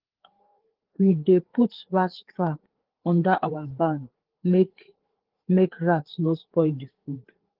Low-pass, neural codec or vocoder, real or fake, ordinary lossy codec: 5.4 kHz; codec, 16 kHz, 4 kbps, FreqCodec, larger model; fake; Opus, 16 kbps